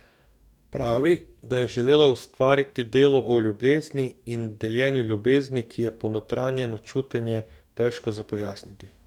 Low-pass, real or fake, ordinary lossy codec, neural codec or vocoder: 19.8 kHz; fake; none; codec, 44.1 kHz, 2.6 kbps, DAC